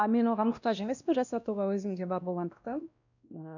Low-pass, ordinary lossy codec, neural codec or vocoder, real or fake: 7.2 kHz; none; codec, 16 kHz, 1 kbps, X-Codec, WavLM features, trained on Multilingual LibriSpeech; fake